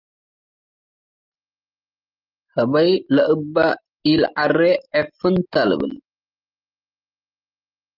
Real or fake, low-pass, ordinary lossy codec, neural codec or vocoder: real; 5.4 kHz; Opus, 32 kbps; none